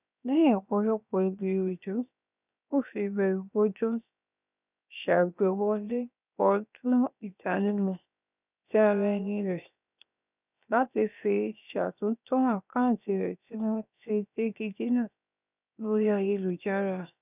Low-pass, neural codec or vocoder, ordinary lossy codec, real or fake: 3.6 kHz; codec, 16 kHz, 0.7 kbps, FocalCodec; none; fake